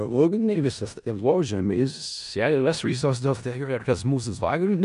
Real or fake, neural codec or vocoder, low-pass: fake; codec, 16 kHz in and 24 kHz out, 0.4 kbps, LongCat-Audio-Codec, four codebook decoder; 10.8 kHz